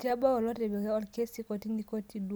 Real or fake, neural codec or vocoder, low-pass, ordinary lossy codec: real; none; none; none